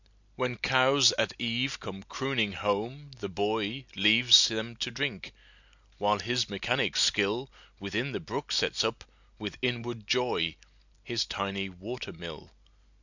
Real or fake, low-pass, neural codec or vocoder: real; 7.2 kHz; none